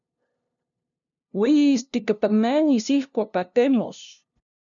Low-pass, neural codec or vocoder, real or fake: 7.2 kHz; codec, 16 kHz, 0.5 kbps, FunCodec, trained on LibriTTS, 25 frames a second; fake